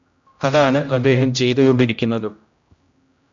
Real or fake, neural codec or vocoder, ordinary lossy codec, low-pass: fake; codec, 16 kHz, 0.5 kbps, X-Codec, HuBERT features, trained on general audio; MP3, 64 kbps; 7.2 kHz